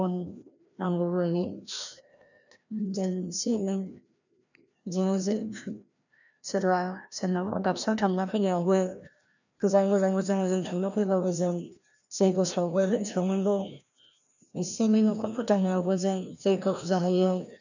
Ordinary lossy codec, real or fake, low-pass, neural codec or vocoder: none; fake; 7.2 kHz; codec, 16 kHz, 1 kbps, FreqCodec, larger model